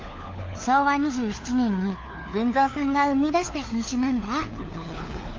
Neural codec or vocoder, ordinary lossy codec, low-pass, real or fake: codec, 16 kHz, 4 kbps, FunCodec, trained on LibriTTS, 50 frames a second; Opus, 24 kbps; 7.2 kHz; fake